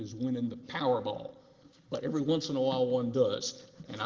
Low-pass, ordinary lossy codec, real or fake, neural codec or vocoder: 7.2 kHz; Opus, 16 kbps; real; none